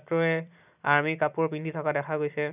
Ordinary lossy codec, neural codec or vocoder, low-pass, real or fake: none; vocoder, 44.1 kHz, 128 mel bands every 256 samples, BigVGAN v2; 3.6 kHz; fake